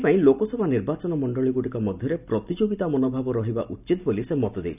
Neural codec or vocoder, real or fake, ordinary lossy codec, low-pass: none; real; none; 3.6 kHz